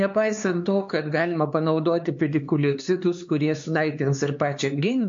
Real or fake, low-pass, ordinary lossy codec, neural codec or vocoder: fake; 7.2 kHz; MP3, 48 kbps; codec, 16 kHz, 4 kbps, X-Codec, HuBERT features, trained on LibriSpeech